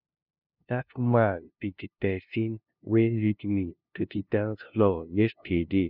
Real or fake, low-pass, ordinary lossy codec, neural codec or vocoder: fake; 5.4 kHz; none; codec, 16 kHz, 0.5 kbps, FunCodec, trained on LibriTTS, 25 frames a second